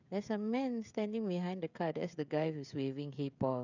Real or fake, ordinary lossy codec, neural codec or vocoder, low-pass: fake; none; codec, 16 kHz, 16 kbps, FreqCodec, smaller model; 7.2 kHz